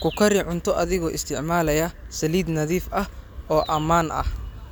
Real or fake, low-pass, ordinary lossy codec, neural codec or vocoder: real; none; none; none